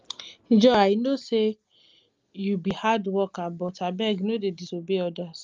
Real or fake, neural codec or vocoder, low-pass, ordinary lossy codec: real; none; 7.2 kHz; Opus, 24 kbps